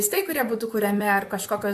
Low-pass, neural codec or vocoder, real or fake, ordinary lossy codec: 14.4 kHz; vocoder, 44.1 kHz, 128 mel bands, Pupu-Vocoder; fake; AAC, 96 kbps